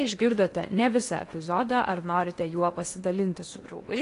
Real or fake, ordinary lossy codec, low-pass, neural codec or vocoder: fake; AAC, 48 kbps; 10.8 kHz; codec, 16 kHz in and 24 kHz out, 0.8 kbps, FocalCodec, streaming, 65536 codes